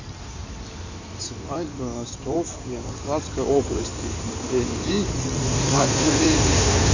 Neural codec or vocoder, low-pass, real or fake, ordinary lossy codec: codec, 16 kHz in and 24 kHz out, 2.2 kbps, FireRedTTS-2 codec; 7.2 kHz; fake; none